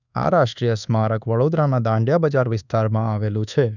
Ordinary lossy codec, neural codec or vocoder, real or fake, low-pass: none; codec, 24 kHz, 1.2 kbps, DualCodec; fake; 7.2 kHz